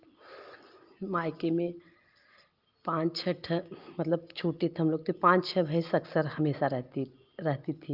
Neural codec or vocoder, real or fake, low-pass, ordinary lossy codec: none; real; 5.4 kHz; Opus, 32 kbps